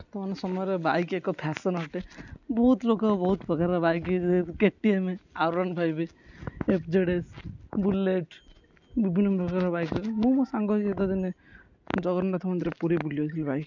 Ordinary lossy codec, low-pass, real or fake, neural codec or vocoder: none; 7.2 kHz; real; none